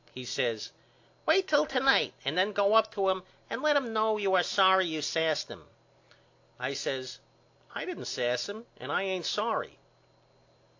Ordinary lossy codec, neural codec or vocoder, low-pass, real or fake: AAC, 48 kbps; none; 7.2 kHz; real